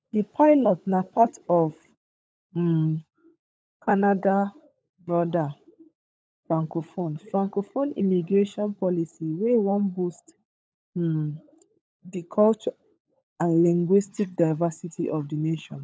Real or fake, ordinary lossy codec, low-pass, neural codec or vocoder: fake; none; none; codec, 16 kHz, 16 kbps, FunCodec, trained on LibriTTS, 50 frames a second